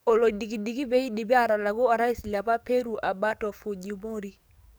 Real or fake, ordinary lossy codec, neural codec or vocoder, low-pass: fake; none; codec, 44.1 kHz, 7.8 kbps, DAC; none